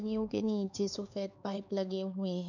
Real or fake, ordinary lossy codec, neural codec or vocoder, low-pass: fake; none; codec, 16 kHz, 4 kbps, X-Codec, HuBERT features, trained on LibriSpeech; 7.2 kHz